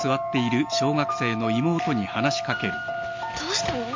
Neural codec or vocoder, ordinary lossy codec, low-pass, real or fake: none; MP3, 48 kbps; 7.2 kHz; real